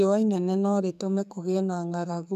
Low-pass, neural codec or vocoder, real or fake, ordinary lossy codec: 14.4 kHz; codec, 44.1 kHz, 2.6 kbps, SNAC; fake; none